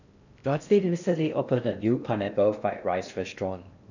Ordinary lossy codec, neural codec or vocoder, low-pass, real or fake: none; codec, 16 kHz in and 24 kHz out, 0.6 kbps, FocalCodec, streaming, 4096 codes; 7.2 kHz; fake